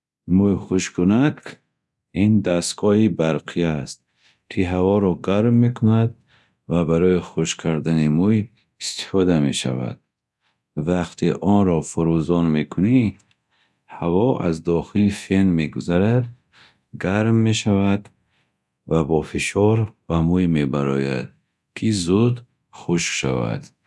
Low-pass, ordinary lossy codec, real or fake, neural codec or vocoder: none; none; fake; codec, 24 kHz, 0.9 kbps, DualCodec